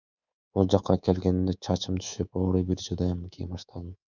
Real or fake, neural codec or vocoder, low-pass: fake; codec, 24 kHz, 3.1 kbps, DualCodec; 7.2 kHz